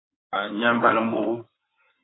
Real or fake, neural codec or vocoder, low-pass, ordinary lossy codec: fake; codec, 16 kHz in and 24 kHz out, 2.2 kbps, FireRedTTS-2 codec; 7.2 kHz; AAC, 16 kbps